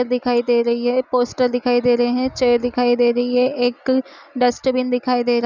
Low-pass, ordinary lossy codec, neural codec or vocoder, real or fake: 7.2 kHz; none; none; real